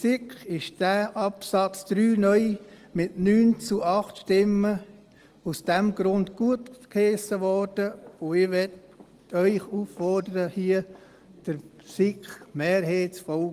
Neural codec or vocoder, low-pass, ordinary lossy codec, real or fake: none; 14.4 kHz; Opus, 24 kbps; real